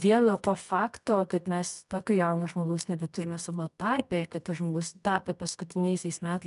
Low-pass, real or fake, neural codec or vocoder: 10.8 kHz; fake; codec, 24 kHz, 0.9 kbps, WavTokenizer, medium music audio release